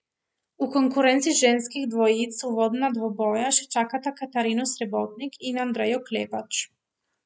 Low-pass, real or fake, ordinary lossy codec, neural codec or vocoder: none; real; none; none